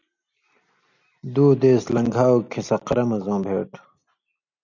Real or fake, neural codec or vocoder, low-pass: real; none; 7.2 kHz